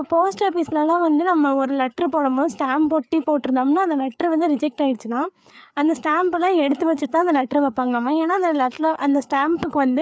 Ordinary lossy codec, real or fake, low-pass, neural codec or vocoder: none; fake; none; codec, 16 kHz, 4 kbps, FreqCodec, larger model